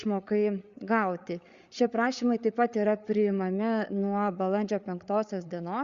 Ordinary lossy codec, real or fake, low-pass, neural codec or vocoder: Opus, 64 kbps; fake; 7.2 kHz; codec, 16 kHz, 8 kbps, FreqCodec, larger model